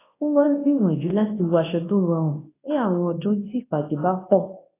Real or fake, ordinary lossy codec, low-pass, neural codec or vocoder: fake; AAC, 16 kbps; 3.6 kHz; codec, 24 kHz, 0.9 kbps, WavTokenizer, large speech release